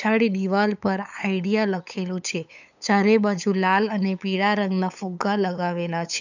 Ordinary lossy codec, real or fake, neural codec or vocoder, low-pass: none; fake; codec, 16 kHz, 8 kbps, FunCodec, trained on LibriTTS, 25 frames a second; 7.2 kHz